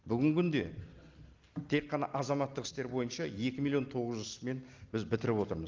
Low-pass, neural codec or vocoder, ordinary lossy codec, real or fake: 7.2 kHz; none; Opus, 32 kbps; real